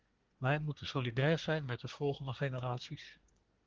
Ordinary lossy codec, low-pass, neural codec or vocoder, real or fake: Opus, 16 kbps; 7.2 kHz; codec, 24 kHz, 1 kbps, SNAC; fake